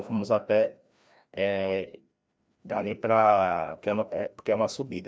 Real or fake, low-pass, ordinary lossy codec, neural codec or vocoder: fake; none; none; codec, 16 kHz, 1 kbps, FreqCodec, larger model